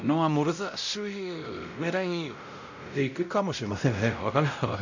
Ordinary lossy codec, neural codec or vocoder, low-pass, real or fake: none; codec, 16 kHz, 0.5 kbps, X-Codec, WavLM features, trained on Multilingual LibriSpeech; 7.2 kHz; fake